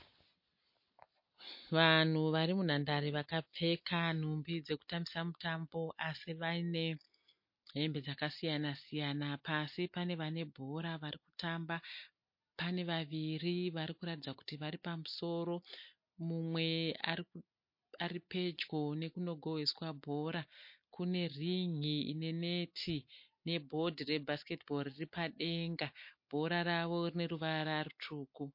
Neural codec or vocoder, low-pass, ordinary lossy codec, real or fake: none; 5.4 kHz; MP3, 32 kbps; real